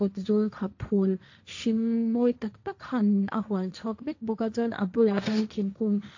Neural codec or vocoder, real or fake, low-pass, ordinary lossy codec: codec, 16 kHz, 1.1 kbps, Voila-Tokenizer; fake; 7.2 kHz; AAC, 48 kbps